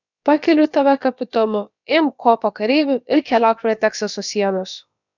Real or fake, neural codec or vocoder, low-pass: fake; codec, 16 kHz, about 1 kbps, DyCAST, with the encoder's durations; 7.2 kHz